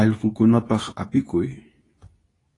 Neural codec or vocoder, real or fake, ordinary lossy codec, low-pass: codec, 24 kHz, 0.9 kbps, WavTokenizer, medium speech release version 2; fake; AAC, 32 kbps; 10.8 kHz